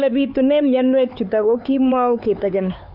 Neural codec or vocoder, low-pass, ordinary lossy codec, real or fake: codec, 16 kHz, 4 kbps, X-Codec, HuBERT features, trained on LibriSpeech; 5.4 kHz; Opus, 64 kbps; fake